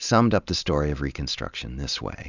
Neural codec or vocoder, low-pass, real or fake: none; 7.2 kHz; real